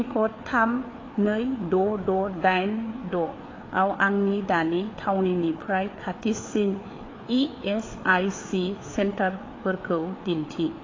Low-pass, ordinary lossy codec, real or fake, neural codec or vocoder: 7.2 kHz; AAC, 32 kbps; fake; codec, 16 kHz, 4 kbps, FreqCodec, larger model